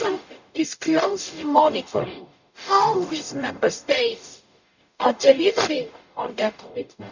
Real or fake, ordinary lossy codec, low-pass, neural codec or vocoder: fake; none; 7.2 kHz; codec, 44.1 kHz, 0.9 kbps, DAC